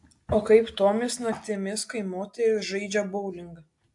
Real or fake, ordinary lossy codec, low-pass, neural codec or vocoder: real; AAC, 64 kbps; 10.8 kHz; none